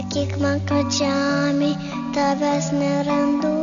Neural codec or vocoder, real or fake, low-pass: none; real; 7.2 kHz